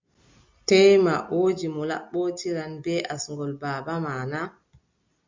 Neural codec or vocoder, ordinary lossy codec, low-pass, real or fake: none; MP3, 64 kbps; 7.2 kHz; real